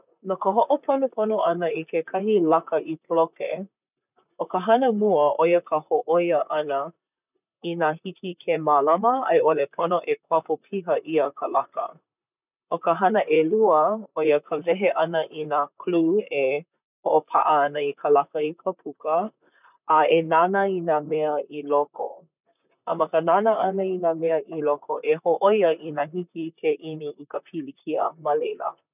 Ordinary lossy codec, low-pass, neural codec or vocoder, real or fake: none; 3.6 kHz; vocoder, 44.1 kHz, 128 mel bands, Pupu-Vocoder; fake